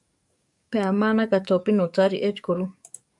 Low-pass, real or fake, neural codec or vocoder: 10.8 kHz; fake; codec, 44.1 kHz, 7.8 kbps, DAC